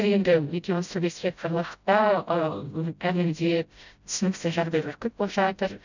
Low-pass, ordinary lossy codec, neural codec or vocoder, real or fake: 7.2 kHz; none; codec, 16 kHz, 0.5 kbps, FreqCodec, smaller model; fake